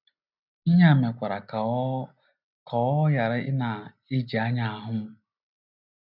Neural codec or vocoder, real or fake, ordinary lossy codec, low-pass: none; real; none; 5.4 kHz